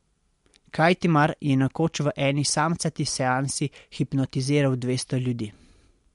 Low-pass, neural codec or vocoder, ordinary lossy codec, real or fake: 10.8 kHz; none; MP3, 64 kbps; real